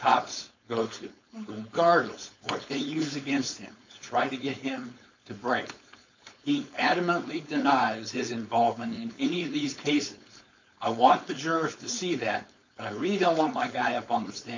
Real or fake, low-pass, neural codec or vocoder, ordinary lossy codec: fake; 7.2 kHz; codec, 16 kHz, 4.8 kbps, FACodec; MP3, 64 kbps